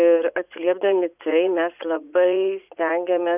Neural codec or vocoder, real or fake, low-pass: vocoder, 44.1 kHz, 80 mel bands, Vocos; fake; 3.6 kHz